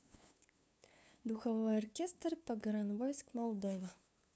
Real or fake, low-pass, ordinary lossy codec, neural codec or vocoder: fake; none; none; codec, 16 kHz, 2 kbps, FunCodec, trained on LibriTTS, 25 frames a second